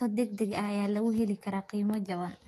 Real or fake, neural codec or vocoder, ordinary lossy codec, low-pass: fake; autoencoder, 48 kHz, 128 numbers a frame, DAC-VAE, trained on Japanese speech; AAC, 48 kbps; 14.4 kHz